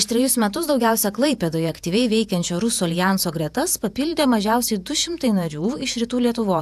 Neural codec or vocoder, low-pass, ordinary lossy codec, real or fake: vocoder, 48 kHz, 128 mel bands, Vocos; 14.4 kHz; AAC, 96 kbps; fake